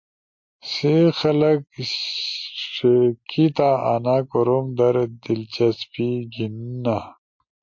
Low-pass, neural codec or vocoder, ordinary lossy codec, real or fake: 7.2 kHz; none; MP3, 48 kbps; real